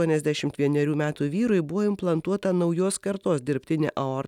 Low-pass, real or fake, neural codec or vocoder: 19.8 kHz; real; none